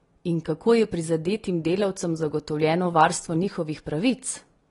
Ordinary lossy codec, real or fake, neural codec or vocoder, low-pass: AAC, 32 kbps; real; none; 10.8 kHz